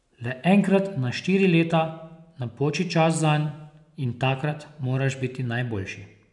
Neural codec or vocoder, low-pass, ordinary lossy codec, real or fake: none; 10.8 kHz; none; real